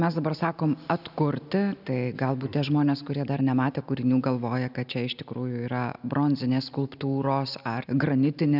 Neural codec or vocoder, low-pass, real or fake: none; 5.4 kHz; real